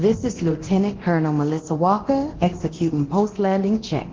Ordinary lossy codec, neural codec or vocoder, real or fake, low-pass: Opus, 16 kbps; codec, 24 kHz, 0.9 kbps, DualCodec; fake; 7.2 kHz